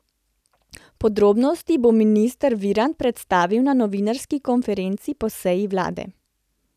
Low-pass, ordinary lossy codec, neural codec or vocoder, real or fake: 14.4 kHz; none; none; real